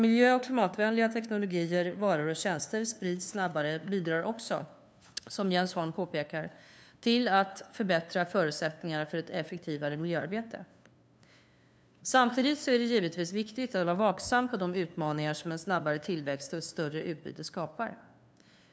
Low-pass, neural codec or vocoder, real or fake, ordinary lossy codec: none; codec, 16 kHz, 2 kbps, FunCodec, trained on LibriTTS, 25 frames a second; fake; none